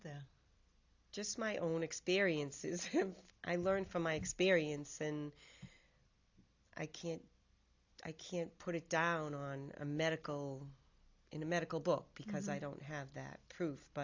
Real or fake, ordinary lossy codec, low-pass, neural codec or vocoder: real; AAC, 48 kbps; 7.2 kHz; none